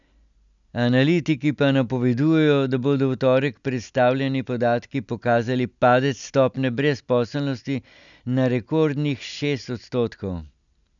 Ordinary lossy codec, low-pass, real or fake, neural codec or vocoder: none; 7.2 kHz; real; none